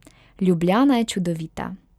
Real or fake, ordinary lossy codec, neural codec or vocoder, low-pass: real; none; none; 19.8 kHz